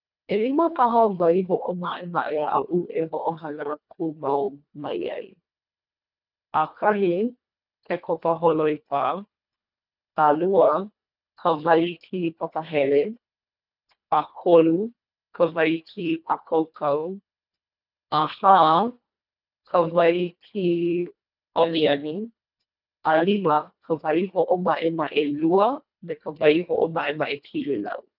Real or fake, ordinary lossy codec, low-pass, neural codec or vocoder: fake; none; 5.4 kHz; codec, 24 kHz, 1.5 kbps, HILCodec